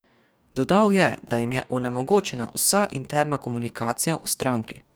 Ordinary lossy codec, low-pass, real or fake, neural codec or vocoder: none; none; fake; codec, 44.1 kHz, 2.6 kbps, DAC